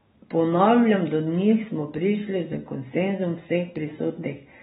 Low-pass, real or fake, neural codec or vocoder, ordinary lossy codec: 14.4 kHz; real; none; AAC, 16 kbps